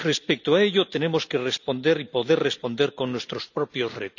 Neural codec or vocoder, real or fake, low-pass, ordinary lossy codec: none; real; 7.2 kHz; none